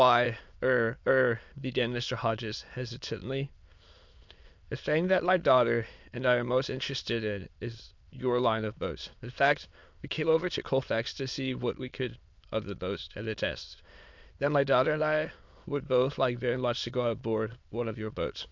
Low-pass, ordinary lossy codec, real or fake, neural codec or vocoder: 7.2 kHz; MP3, 64 kbps; fake; autoencoder, 22.05 kHz, a latent of 192 numbers a frame, VITS, trained on many speakers